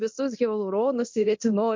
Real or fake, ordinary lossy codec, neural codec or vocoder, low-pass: fake; MP3, 48 kbps; codec, 24 kHz, 0.9 kbps, DualCodec; 7.2 kHz